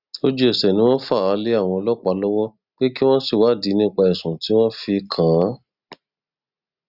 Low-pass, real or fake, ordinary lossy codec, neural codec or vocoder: 5.4 kHz; real; Opus, 64 kbps; none